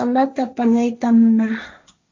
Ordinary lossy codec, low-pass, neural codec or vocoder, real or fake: MP3, 48 kbps; 7.2 kHz; codec, 16 kHz, 1.1 kbps, Voila-Tokenizer; fake